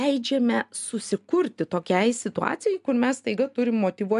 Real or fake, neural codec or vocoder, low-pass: real; none; 10.8 kHz